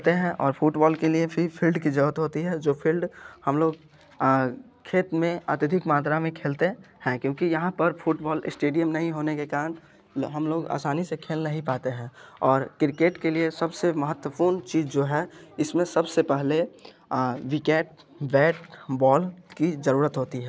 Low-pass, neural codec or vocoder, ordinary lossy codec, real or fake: none; none; none; real